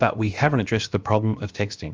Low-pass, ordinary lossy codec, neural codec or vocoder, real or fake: 7.2 kHz; Opus, 24 kbps; codec, 16 kHz, about 1 kbps, DyCAST, with the encoder's durations; fake